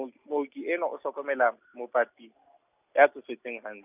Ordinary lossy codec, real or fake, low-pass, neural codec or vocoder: none; real; 3.6 kHz; none